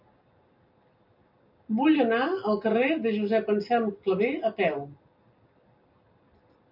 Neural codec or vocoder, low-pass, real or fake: none; 5.4 kHz; real